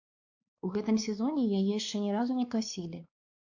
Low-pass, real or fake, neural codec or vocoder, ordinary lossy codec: 7.2 kHz; fake; codec, 16 kHz, 2 kbps, X-Codec, WavLM features, trained on Multilingual LibriSpeech; AAC, 48 kbps